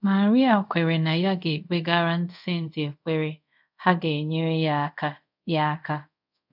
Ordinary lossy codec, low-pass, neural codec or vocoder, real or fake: none; 5.4 kHz; codec, 24 kHz, 0.5 kbps, DualCodec; fake